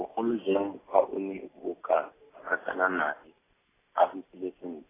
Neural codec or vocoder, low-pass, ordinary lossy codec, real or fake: none; 3.6 kHz; AAC, 16 kbps; real